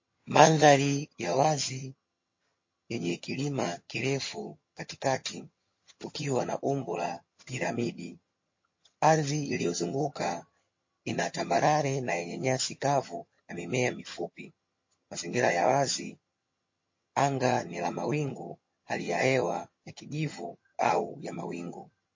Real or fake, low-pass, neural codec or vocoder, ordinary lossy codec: fake; 7.2 kHz; vocoder, 22.05 kHz, 80 mel bands, HiFi-GAN; MP3, 32 kbps